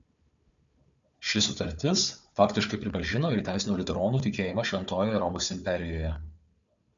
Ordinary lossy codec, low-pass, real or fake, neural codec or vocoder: MP3, 64 kbps; 7.2 kHz; fake; codec, 16 kHz, 4 kbps, FunCodec, trained on Chinese and English, 50 frames a second